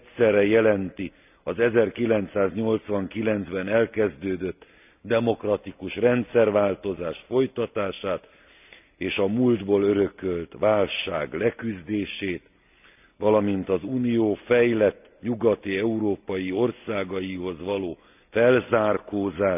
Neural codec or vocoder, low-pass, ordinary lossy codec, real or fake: none; 3.6 kHz; none; real